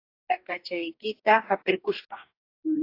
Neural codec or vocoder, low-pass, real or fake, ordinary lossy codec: codec, 44.1 kHz, 2.6 kbps, DAC; 5.4 kHz; fake; AAC, 32 kbps